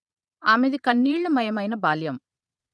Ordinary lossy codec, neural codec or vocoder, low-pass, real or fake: none; vocoder, 22.05 kHz, 80 mel bands, Vocos; none; fake